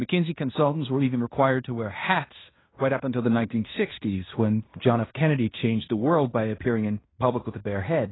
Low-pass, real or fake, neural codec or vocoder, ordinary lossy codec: 7.2 kHz; fake; codec, 16 kHz in and 24 kHz out, 0.9 kbps, LongCat-Audio-Codec, fine tuned four codebook decoder; AAC, 16 kbps